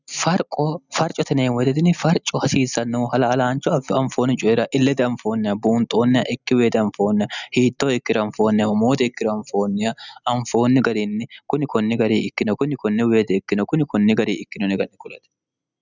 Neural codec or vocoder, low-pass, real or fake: none; 7.2 kHz; real